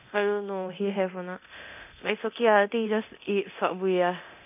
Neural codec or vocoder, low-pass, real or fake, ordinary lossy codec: codec, 24 kHz, 0.9 kbps, DualCodec; 3.6 kHz; fake; none